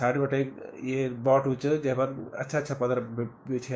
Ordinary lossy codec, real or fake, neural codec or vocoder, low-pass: none; fake; codec, 16 kHz, 6 kbps, DAC; none